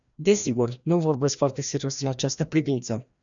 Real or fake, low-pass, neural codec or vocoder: fake; 7.2 kHz; codec, 16 kHz, 1 kbps, FreqCodec, larger model